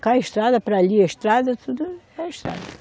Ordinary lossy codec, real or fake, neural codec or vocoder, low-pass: none; real; none; none